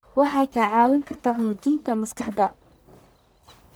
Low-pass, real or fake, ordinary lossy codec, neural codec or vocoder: none; fake; none; codec, 44.1 kHz, 1.7 kbps, Pupu-Codec